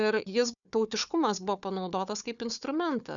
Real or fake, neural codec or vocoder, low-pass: fake; codec, 16 kHz, 4 kbps, FunCodec, trained on Chinese and English, 50 frames a second; 7.2 kHz